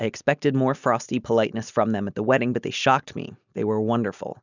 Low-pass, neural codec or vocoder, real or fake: 7.2 kHz; none; real